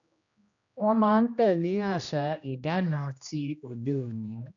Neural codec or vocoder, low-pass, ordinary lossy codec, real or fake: codec, 16 kHz, 1 kbps, X-Codec, HuBERT features, trained on general audio; 7.2 kHz; none; fake